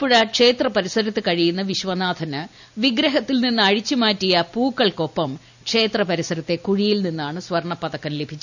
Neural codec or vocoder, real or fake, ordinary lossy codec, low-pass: none; real; none; 7.2 kHz